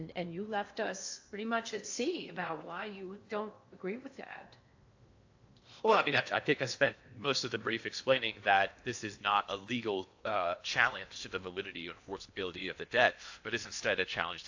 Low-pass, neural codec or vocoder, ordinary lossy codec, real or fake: 7.2 kHz; codec, 16 kHz in and 24 kHz out, 0.8 kbps, FocalCodec, streaming, 65536 codes; AAC, 48 kbps; fake